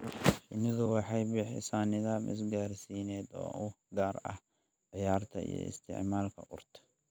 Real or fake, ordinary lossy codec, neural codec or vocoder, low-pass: real; none; none; none